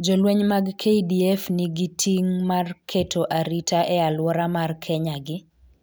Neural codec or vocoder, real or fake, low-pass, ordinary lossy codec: none; real; none; none